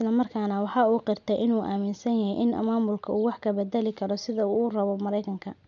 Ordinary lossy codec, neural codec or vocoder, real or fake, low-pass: none; none; real; 7.2 kHz